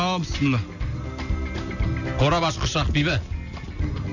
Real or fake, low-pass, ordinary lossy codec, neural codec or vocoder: real; 7.2 kHz; none; none